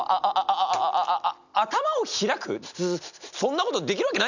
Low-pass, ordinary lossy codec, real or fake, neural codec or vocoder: 7.2 kHz; none; real; none